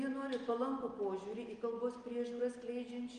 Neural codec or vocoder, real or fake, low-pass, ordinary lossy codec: none; real; 9.9 kHz; Opus, 24 kbps